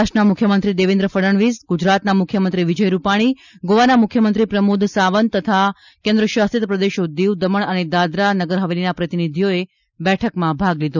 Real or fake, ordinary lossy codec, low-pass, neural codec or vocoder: real; none; 7.2 kHz; none